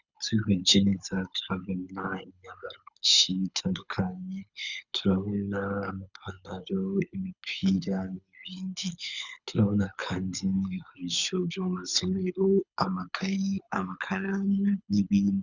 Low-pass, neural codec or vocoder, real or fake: 7.2 kHz; codec, 24 kHz, 6 kbps, HILCodec; fake